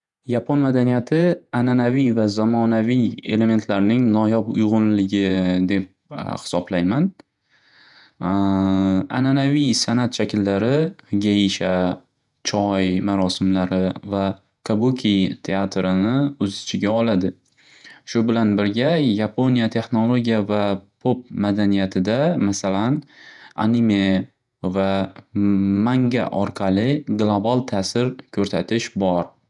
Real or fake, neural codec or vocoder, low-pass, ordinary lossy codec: fake; vocoder, 48 kHz, 128 mel bands, Vocos; 10.8 kHz; none